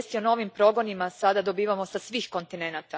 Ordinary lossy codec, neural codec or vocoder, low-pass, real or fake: none; none; none; real